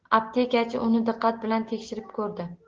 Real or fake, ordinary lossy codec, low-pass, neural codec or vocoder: real; Opus, 16 kbps; 7.2 kHz; none